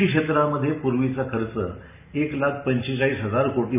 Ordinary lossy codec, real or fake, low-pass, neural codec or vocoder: none; real; 3.6 kHz; none